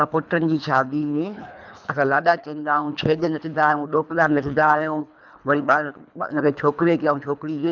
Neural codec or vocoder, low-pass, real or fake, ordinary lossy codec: codec, 24 kHz, 3 kbps, HILCodec; 7.2 kHz; fake; none